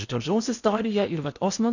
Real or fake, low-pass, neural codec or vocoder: fake; 7.2 kHz; codec, 16 kHz in and 24 kHz out, 0.6 kbps, FocalCodec, streaming, 4096 codes